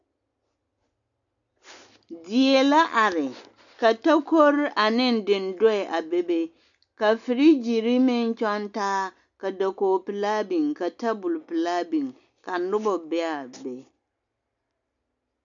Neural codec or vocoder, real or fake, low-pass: none; real; 7.2 kHz